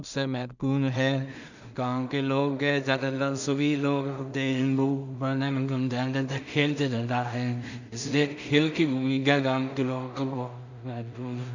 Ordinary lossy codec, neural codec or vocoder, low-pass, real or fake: none; codec, 16 kHz in and 24 kHz out, 0.4 kbps, LongCat-Audio-Codec, two codebook decoder; 7.2 kHz; fake